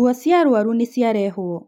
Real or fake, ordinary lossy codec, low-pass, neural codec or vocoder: real; none; 19.8 kHz; none